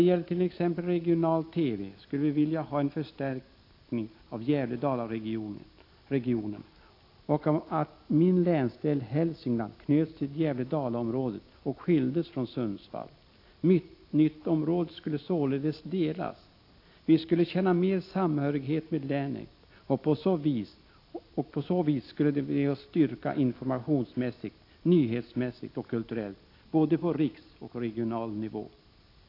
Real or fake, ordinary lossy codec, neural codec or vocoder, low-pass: real; AAC, 32 kbps; none; 5.4 kHz